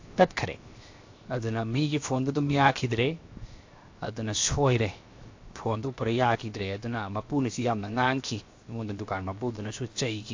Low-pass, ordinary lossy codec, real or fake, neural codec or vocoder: 7.2 kHz; AAC, 48 kbps; fake; codec, 16 kHz, 0.7 kbps, FocalCodec